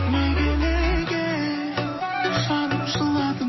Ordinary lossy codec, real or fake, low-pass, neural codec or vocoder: MP3, 24 kbps; real; 7.2 kHz; none